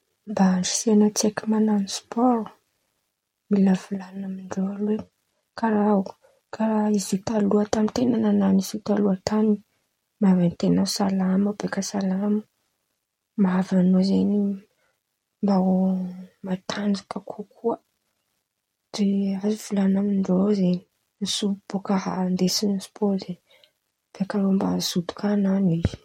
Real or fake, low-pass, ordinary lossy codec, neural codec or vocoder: real; 19.8 kHz; MP3, 64 kbps; none